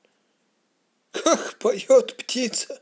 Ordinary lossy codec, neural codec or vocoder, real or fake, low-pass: none; none; real; none